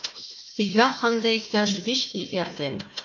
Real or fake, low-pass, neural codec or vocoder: fake; 7.2 kHz; codec, 16 kHz, 1 kbps, FunCodec, trained on Chinese and English, 50 frames a second